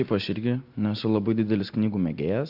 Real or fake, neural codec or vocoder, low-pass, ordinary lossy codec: real; none; 5.4 kHz; AAC, 48 kbps